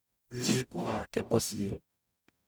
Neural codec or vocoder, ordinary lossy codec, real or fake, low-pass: codec, 44.1 kHz, 0.9 kbps, DAC; none; fake; none